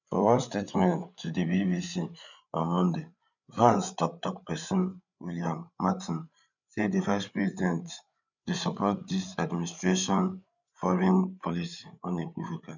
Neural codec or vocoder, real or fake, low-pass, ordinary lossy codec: codec, 16 kHz, 8 kbps, FreqCodec, larger model; fake; 7.2 kHz; none